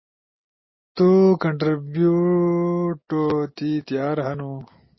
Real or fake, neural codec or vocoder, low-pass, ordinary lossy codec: real; none; 7.2 kHz; MP3, 24 kbps